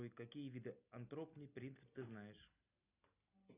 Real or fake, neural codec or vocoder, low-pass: real; none; 3.6 kHz